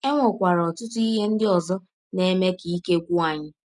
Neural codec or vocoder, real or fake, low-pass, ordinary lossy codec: none; real; 10.8 kHz; none